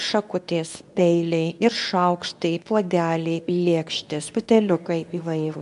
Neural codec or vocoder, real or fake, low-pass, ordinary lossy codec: codec, 24 kHz, 0.9 kbps, WavTokenizer, medium speech release version 2; fake; 10.8 kHz; AAC, 96 kbps